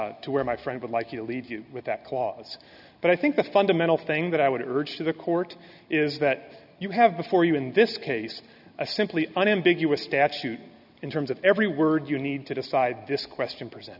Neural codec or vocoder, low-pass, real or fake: none; 5.4 kHz; real